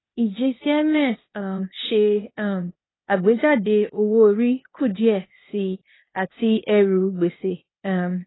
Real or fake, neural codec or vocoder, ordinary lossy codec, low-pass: fake; codec, 16 kHz, 0.8 kbps, ZipCodec; AAC, 16 kbps; 7.2 kHz